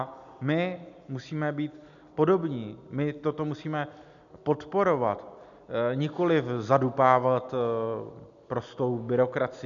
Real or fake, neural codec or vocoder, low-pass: real; none; 7.2 kHz